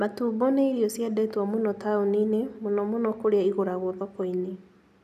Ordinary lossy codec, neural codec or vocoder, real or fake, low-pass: none; none; real; 14.4 kHz